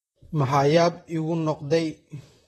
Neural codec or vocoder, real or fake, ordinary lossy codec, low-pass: vocoder, 44.1 kHz, 128 mel bands, Pupu-Vocoder; fake; AAC, 32 kbps; 19.8 kHz